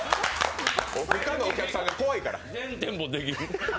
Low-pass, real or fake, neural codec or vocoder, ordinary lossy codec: none; real; none; none